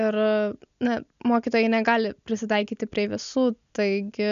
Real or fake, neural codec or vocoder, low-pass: real; none; 7.2 kHz